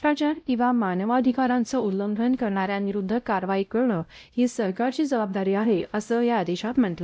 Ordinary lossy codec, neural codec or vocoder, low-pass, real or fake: none; codec, 16 kHz, 0.5 kbps, X-Codec, WavLM features, trained on Multilingual LibriSpeech; none; fake